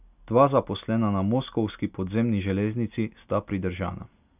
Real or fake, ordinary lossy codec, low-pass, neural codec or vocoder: real; none; 3.6 kHz; none